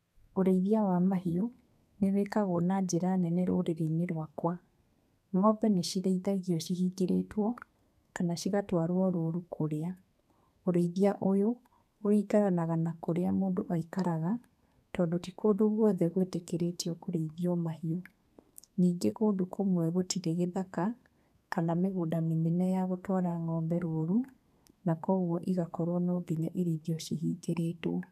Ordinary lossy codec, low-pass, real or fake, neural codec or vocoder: none; 14.4 kHz; fake; codec, 32 kHz, 1.9 kbps, SNAC